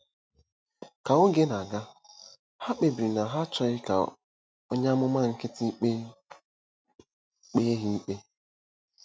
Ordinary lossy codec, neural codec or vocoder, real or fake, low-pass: none; none; real; none